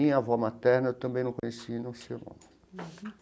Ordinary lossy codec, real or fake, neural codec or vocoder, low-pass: none; real; none; none